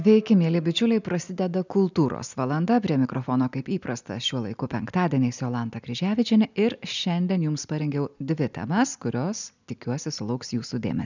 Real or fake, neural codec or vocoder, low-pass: real; none; 7.2 kHz